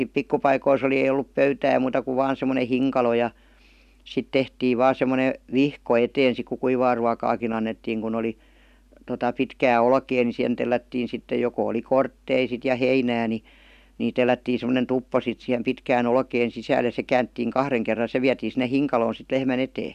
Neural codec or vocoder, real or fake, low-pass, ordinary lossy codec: none; real; 14.4 kHz; none